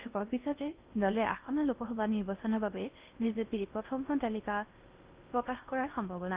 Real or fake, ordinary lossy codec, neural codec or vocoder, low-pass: fake; Opus, 32 kbps; codec, 16 kHz in and 24 kHz out, 0.6 kbps, FocalCodec, streaming, 4096 codes; 3.6 kHz